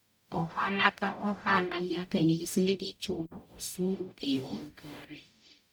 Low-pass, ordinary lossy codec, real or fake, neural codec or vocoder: none; none; fake; codec, 44.1 kHz, 0.9 kbps, DAC